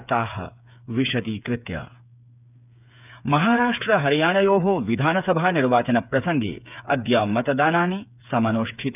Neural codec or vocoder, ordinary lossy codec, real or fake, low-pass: codec, 16 kHz, 8 kbps, FreqCodec, smaller model; none; fake; 3.6 kHz